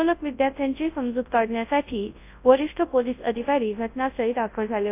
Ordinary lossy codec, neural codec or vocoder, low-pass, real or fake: AAC, 24 kbps; codec, 24 kHz, 0.9 kbps, WavTokenizer, large speech release; 3.6 kHz; fake